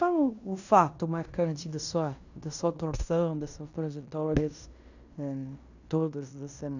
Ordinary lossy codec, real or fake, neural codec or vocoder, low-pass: none; fake; codec, 16 kHz in and 24 kHz out, 0.9 kbps, LongCat-Audio-Codec, fine tuned four codebook decoder; 7.2 kHz